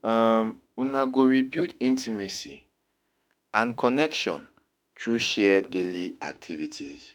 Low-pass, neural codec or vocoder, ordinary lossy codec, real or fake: 19.8 kHz; autoencoder, 48 kHz, 32 numbers a frame, DAC-VAE, trained on Japanese speech; none; fake